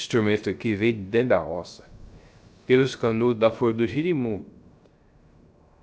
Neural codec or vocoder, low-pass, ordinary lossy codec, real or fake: codec, 16 kHz, 0.3 kbps, FocalCodec; none; none; fake